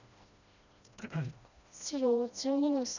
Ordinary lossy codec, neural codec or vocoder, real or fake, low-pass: none; codec, 16 kHz, 1 kbps, FreqCodec, smaller model; fake; 7.2 kHz